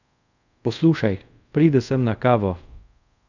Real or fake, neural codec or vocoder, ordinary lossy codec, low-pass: fake; codec, 24 kHz, 0.5 kbps, DualCodec; none; 7.2 kHz